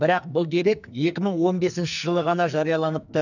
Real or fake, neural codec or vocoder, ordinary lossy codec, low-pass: fake; codec, 44.1 kHz, 2.6 kbps, SNAC; none; 7.2 kHz